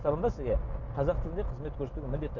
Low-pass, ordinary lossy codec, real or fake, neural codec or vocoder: 7.2 kHz; none; real; none